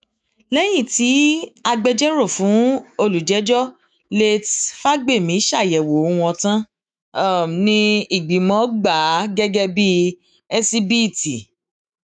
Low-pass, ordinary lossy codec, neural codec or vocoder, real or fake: 9.9 kHz; none; autoencoder, 48 kHz, 128 numbers a frame, DAC-VAE, trained on Japanese speech; fake